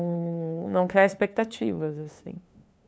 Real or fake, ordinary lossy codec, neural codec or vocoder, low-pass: fake; none; codec, 16 kHz, 2 kbps, FunCodec, trained on LibriTTS, 25 frames a second; none